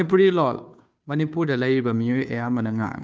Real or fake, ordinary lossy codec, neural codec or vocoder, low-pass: fake; none; codec, 16 kHz, 2 kbps, FunCodec, trained on Chinese and English, 25 frames a second; none